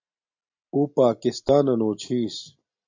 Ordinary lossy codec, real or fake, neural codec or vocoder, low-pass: AAC, 48 kbps; real; none; 7.2 kHz